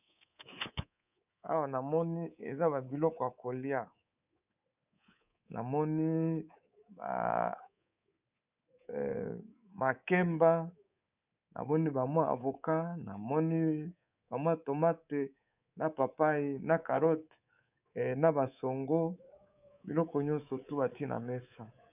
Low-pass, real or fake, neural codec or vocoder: 3.6 kHz; fake; codec, 24 kHz, 3.1 kbps, DualCodec